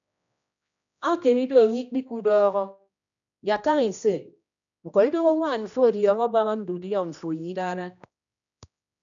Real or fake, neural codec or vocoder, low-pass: fake; codec, 16 kHz, 1 kbps, X-Codec, HuBERT features, trained on general audio; 7.2 kHz